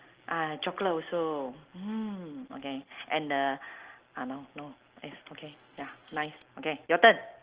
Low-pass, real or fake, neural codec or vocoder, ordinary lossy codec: 3.6 kHz; real; none; Opus, 16 kbps